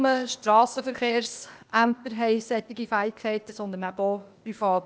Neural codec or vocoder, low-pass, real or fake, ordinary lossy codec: codec, 16 kHz, 0.8 kbps, ZipCodec; none; fake; none